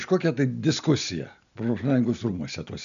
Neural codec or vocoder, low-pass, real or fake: none; 7.2 kHz; real